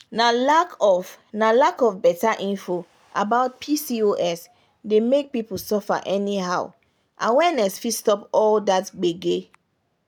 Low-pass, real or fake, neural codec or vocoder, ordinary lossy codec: none; real; none; none